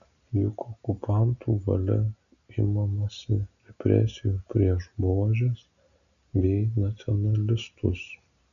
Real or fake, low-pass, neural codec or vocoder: real; 7.2 kHz; none